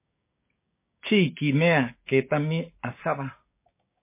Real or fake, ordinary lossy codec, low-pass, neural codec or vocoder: fake; MP3, 24 kbps; 3.6 kHz; codec, 16 kHz, 6 kbps, DAC